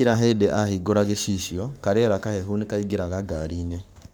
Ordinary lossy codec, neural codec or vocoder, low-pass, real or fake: none; codec, 44.1 kHz, 7.8 kbps, Pupu-Codec; none; fake